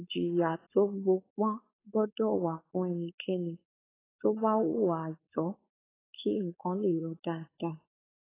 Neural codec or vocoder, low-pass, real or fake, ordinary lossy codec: codec, 16 kHz, 4.8 kbps, FACodec; 3.6 kHz; fake; AAC, 16 kbps